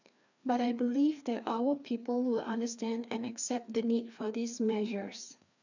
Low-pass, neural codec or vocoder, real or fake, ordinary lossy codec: 7.2 kHz; codec, 16 kHz, 2 kbps, FreqCodec, larger model; fake; none